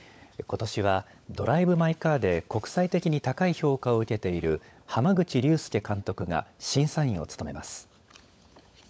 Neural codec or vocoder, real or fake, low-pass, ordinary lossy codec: codec, 16 kHz, 16 kbps, FunCodec, trained on LibriTTS, 50 frames a second; fake; none; none